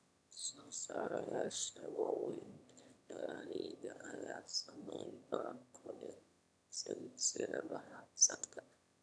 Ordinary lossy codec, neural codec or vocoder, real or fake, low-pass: none; autoencoder, 22.05 kHz, a latent of 192 numbers a frame, VITS, trained on one speaker; fake; none